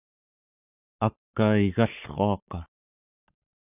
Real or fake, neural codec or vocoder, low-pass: fake; codec, 44.1 kHz, 7.8 kbps, Pupu-Codec; 3.6 kHz